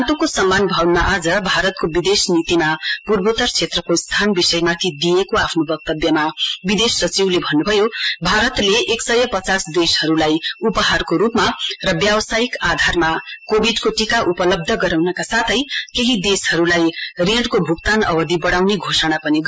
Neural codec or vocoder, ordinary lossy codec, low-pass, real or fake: none; none; none; real